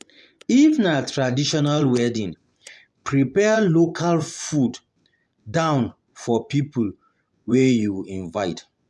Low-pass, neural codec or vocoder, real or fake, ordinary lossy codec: none; vocoder, 24 kHz, 100 mel bands, Vocos; fake; none